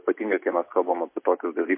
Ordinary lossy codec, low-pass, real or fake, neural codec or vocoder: MP3, 24 kbps; 3.6 kHz; real; none